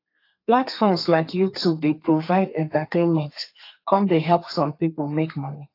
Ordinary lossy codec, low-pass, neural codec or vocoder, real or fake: AAC, 32 kbps; 5.4 kHz; codec, 32 kHz, 1.9 kbps, SNAC; fake